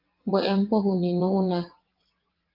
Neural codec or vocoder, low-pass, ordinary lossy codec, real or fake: vocoder, 22.05 kHz, 80 mel bands, WaveNeXt; 5.4 kHz; Opus, 32 kbps; fake